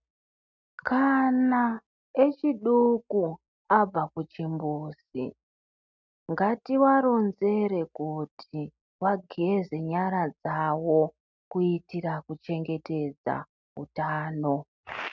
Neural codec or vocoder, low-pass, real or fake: none; 7.2 kHz; real